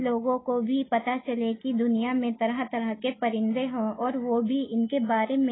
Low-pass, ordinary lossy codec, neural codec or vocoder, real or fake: 7.2 kHz; AAC, 16 kbps; none; real